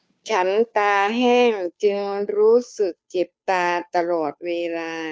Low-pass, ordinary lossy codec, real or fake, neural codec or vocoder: none; none; fake; codec, 16 kHz, 2 kbps, FunCodec, trained on Chinese and English, 25 frames a second